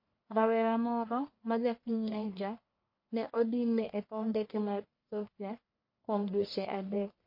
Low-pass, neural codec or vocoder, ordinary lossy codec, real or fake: 5.4 kHz; codec, 44.1 kHz, 1.7 kbps, Pupu-Codec; MP3, 32 kbps; fake